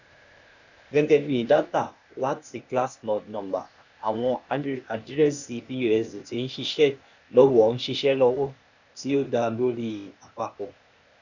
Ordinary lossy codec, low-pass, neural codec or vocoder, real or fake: none; 7.2 kHz; codec, 16 kHz, 0.8 kbps, ZipCodec; fake